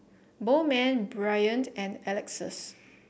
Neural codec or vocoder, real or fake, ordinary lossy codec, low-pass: none; real; none; none